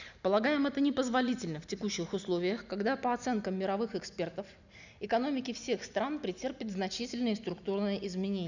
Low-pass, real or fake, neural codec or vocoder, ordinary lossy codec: 7.2 kHz; real; none; none